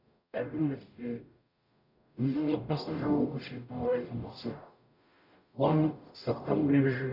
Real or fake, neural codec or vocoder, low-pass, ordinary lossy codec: fake; codec, 44.1 kHz, 0.9 kbps, DAC; 5.4 kHz; none